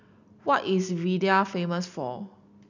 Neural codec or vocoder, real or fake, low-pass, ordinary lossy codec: none; real; 7.2 kHz; none